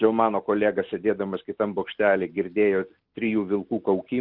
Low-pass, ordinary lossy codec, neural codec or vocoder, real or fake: 5.4 kHz; Opus, 24 kbps; none; real